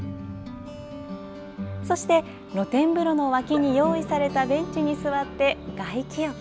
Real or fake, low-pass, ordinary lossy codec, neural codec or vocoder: real; none; none; none